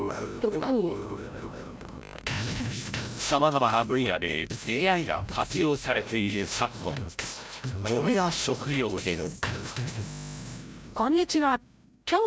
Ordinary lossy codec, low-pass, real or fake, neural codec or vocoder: none; none; fake; codec, 16 kHz, 0.5 kbps, FreqCodec, larger model